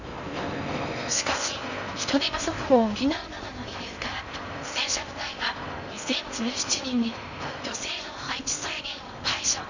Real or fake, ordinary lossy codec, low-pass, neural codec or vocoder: fake; none; 7.2 kHz; codec, 16 kHz in and 24 kHz out, 0.6 kbps, FocalCodec, streaming, 4096 codes